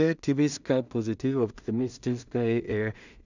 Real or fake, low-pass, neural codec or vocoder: fake; 7.2 kHz; codec, 16 kHz in and 24 kHz out, 0.4 kbps, LongCat-Audio-Codec, two codebook decoder